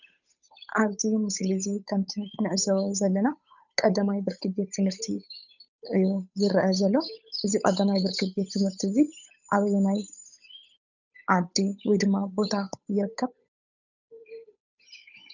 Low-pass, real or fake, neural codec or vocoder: 7.2 kHz; fake; codec, 16 kHz, 8 kbps, FunCodec, trained on Chinese and English, 25 frames a second